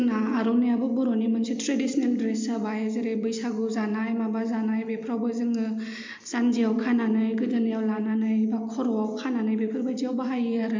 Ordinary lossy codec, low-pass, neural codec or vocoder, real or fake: MP3, 48 kbps; 7.2 kHz; none; real